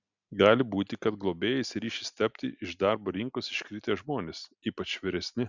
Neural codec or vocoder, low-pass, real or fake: none; 7.2 kHz; real